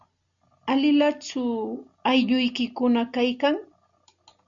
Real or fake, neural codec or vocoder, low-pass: real; none; 7.2 kHz